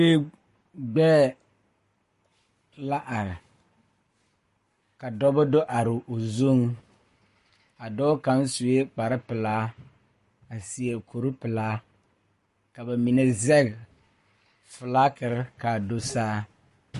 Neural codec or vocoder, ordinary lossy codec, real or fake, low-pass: codec, 44.1 kHz, 7.8 kbps, Pupu-Codec; MP3, 48 kbps; fake; 14.4 kHz